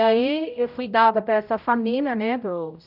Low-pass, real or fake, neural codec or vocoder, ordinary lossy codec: 5.4 kHz; fake; codec, 16 kHz, 0.5 kbps, X-Codec, HuBERT features, trained on general audio; none